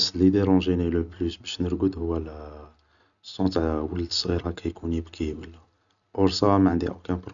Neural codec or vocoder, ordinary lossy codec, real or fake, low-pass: none; none; real; 7.2 kHz